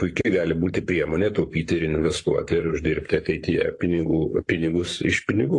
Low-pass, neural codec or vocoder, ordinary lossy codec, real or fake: 10.8 kHz; codec, 44.1 kHz, 7.8 kbps, Pupu-Codec; AAC, 48 kbps; fake